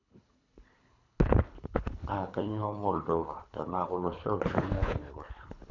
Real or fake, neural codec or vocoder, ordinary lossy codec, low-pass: fake; codec, 24 kHz, 3 kbps, HILCodec; none; 7.2 kHz